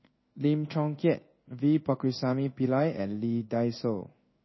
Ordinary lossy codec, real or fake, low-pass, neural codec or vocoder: MP3, 24 kbps; fake; 7.2 kHz; codec, 16 kHz in and 24 kHz out, 1 kbps, XY-Tokenizer